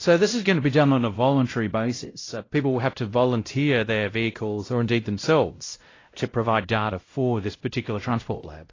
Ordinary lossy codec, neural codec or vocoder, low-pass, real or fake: AAC, 32 kbps; codec, 16 kHz, 0.5 kbps, X-Codec, WavLM features, trained on Multilingual LibriSpeech; 7.2 kHz; fake